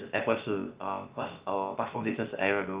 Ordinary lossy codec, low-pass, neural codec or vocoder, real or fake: Opus, 32 kbps; 3.6 kHz; codec, 16 kHz, about 1 kbps, DyCAST, with the encoder's durations; fake